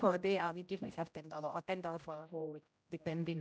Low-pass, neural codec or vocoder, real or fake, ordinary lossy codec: none; codec, 16 kHz, 0.5 kbps, X-Codec, HuBERT features, trained on general audio; fake; none